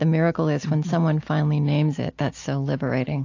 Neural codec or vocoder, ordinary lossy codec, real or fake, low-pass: none; AAC, 48 kbps; real; 7.2 kHz